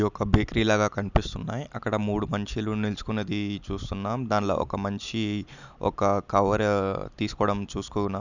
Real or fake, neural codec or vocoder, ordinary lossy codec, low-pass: real; none; none; 7.2 kHz